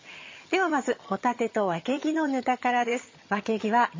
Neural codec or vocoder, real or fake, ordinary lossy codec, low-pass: vocoder, 22.05 kHz, 80 mel bands, HiFi-GAN; fake; MP3, 32 kbps; 7.2 kHz